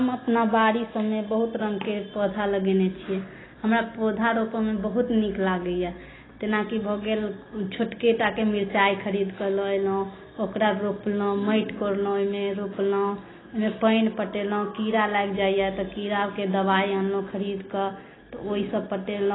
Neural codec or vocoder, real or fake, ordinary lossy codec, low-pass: none; real; AAC, 16 kbps; 7.2 kHz